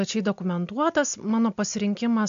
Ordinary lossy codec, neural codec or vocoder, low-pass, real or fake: AAC, 64 kbps; none; 7.2 kHz; real